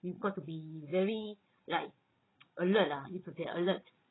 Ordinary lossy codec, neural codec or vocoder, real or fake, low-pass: AAC, 16 kbps; codec, 16 kHz, 16 kbps, FreqCodec, larger model; fake; 7.2 kHz